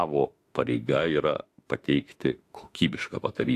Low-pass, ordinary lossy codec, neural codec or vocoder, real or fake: 14.4 kHz; AAC, 64 kbps; autoencoder, 48 kHz, 32 numbers a frame, DAC-VAE, trained on Japanese speech; fake